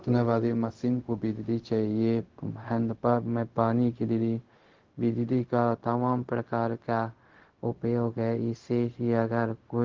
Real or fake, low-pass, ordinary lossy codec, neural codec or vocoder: fake; 7.2 kHz; Opus, 16 kbps; codec, 16 kHz, 0.4 kbps, LongCat-Audio-Codec